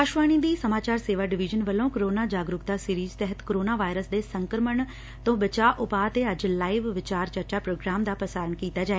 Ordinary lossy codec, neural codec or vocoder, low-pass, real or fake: none; none; none; real